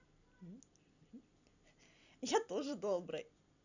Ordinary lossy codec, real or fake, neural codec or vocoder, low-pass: none; fake; vocoder, 44.1 kHz, 128 mel bands every 256 samples, BigVGAN v2; 7.2 kHz